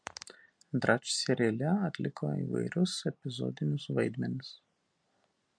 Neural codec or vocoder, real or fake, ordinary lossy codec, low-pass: none; real; MP3, 64 kbps; 9.9 kHz